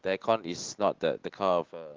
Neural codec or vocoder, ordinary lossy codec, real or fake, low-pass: none; Opus, 16 kbps; real; 7.2 kHz